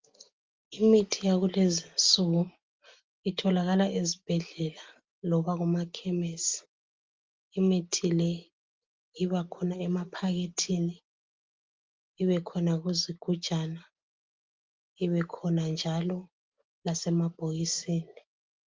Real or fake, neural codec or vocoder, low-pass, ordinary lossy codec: real; none; 7.2 kHz; Opus, 32 kbps